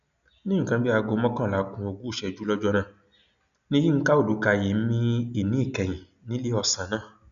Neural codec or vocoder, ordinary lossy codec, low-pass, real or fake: none; none; 7.2 kHz; real